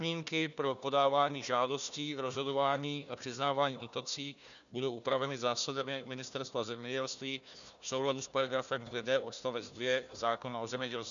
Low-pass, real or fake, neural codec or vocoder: 7.2 kHz; fake; codec, 16 kHz, 1 kbps, FunCodec, trained on Chinese and English, 50 frames a second